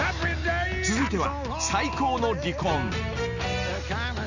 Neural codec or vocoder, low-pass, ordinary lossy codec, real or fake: none; 7.2 kHz; none; real